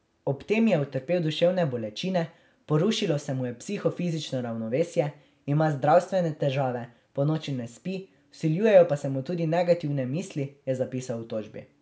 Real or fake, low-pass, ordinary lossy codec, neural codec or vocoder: real; none; none; none